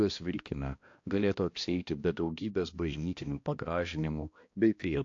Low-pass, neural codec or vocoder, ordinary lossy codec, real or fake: 7.2 kHz; codec, 16 kHz, 1 kbps, X-Codec, HuBERT features, trained on balanced general audio; AAC, 48 kbps; fake